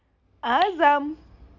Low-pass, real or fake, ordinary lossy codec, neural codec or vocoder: 7.2 kHz; real; none; none